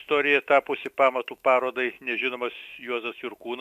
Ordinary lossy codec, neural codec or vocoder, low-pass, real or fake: MP3, 96 kbps; autoencoder, 48 kHz, 128 numbers a frame, DAC-VAE, trained on Japanese speech; 14.4 kHz; fake